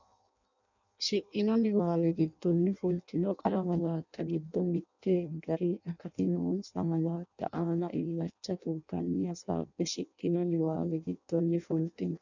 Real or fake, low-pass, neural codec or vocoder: fake; 7.2 kHz; codec, 16 kHz in and 24 kHz out, 0.6 kbps, FireRedTTS-2 codec